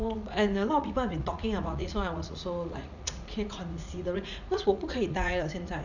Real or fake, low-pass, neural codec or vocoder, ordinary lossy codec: fake; 7.2 kHz; vocoder, 44.1 kHz, 80 mel bands, Vocos; none